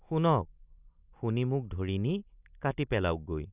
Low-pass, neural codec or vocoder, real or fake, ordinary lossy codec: 3.6 kHz; none; real; Opus, 64 kbps